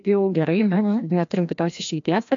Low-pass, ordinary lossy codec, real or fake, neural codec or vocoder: 7.2 kHz; AAC, 64 kbps; fake; codec, 16 kHz, 1 kbps, FreqCodec, larger model